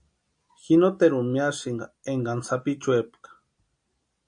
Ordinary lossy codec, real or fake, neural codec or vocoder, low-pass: AAC, 64 kbps; real; none; 9.9 kHz